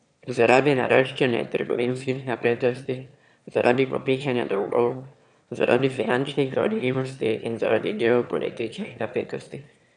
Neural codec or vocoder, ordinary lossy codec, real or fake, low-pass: autoencoder, 22.05 kHz, a latent of 192 numbers a frame, VITS, trained on one speaker; none; fake; 9.9 kHz